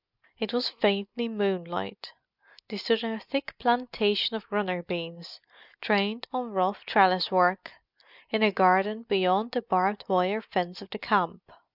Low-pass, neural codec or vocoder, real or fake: 5.4 kHz; none; real